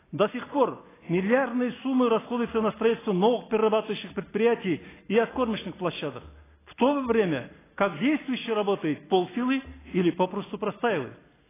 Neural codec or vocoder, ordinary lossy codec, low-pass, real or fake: none; AAC, 16 kbps; 3.6 kHz; real